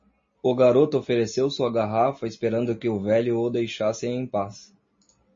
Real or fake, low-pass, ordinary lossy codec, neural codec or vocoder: real; 7.2 kHz; MP3, 32 kbps; none